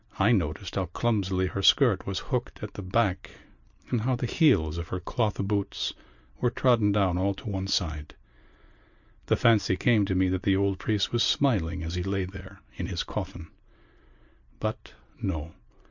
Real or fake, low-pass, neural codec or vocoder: real; 7.2 kHz; none